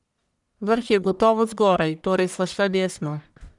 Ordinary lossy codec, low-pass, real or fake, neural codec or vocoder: none; 10.8 kHz; fake; codec, 44.1 kHz, 1.7 kbps, Pupu-Codec